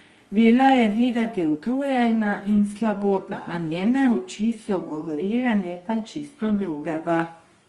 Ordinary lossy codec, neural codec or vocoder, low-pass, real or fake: Opus, 32 kbps; codec, 24 kHz, 0.9 kbps, WavTokenizer, medium music audio release; 10.8 kHz; fake